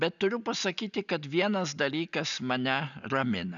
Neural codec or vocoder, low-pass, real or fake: none; 7.2 kHz; real